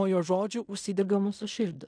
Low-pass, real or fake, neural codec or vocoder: 9.9 kHz; fake; codec, 16 kHz in and 24 kHz out, 0.4 kbps, LongCat-Audio-Codec, fine tuned four codebook decoder